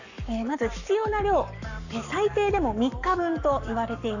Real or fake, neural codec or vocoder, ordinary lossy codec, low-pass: fake; codec, 44.1 kHz, 7.8 kbps, Pupu-Codec; none; 7.2 kHz